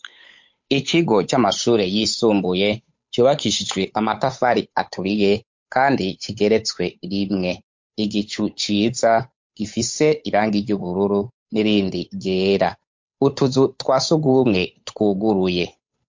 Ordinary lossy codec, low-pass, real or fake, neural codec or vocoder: MP3, 48 kbps; 7.2 kHz; fake; codec, 16 kHz, 8 kbps, FunCodec, trained on Chinese and English, 25 frames a second